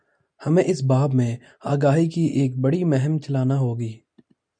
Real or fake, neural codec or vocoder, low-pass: real; none; 9.9 kHz